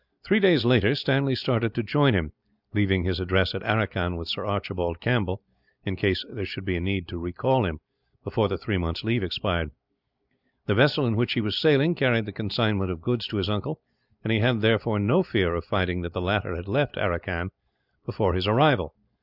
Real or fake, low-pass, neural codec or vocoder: real; 5.4 kHz; none